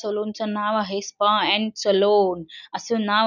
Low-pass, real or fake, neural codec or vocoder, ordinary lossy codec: 7.2 kHz; real; none; none